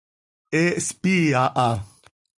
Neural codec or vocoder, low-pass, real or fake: none; 10.8 kHz; real